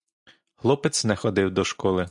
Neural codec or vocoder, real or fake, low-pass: none; real; 10.8 kHz